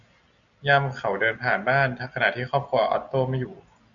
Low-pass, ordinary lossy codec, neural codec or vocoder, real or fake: 7.2 kHz; MP3, 96 kbps; none; real